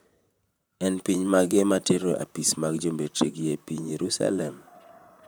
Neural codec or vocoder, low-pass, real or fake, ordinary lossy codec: vocoder, 44.1 kHz, 128 mel bands, Pupu-Vocoder; none; fake; none